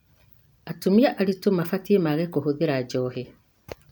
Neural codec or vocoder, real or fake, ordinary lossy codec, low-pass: none; real; none; none